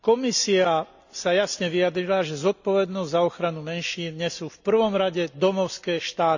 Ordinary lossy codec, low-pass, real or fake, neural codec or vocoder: none; 7.2 kHz; real; none